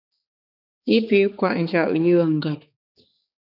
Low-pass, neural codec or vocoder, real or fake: 5.4 kHz; codec, 16 kHz, 4 kbps, X-Codec, HuBERT features, trained on balanced general audio; fake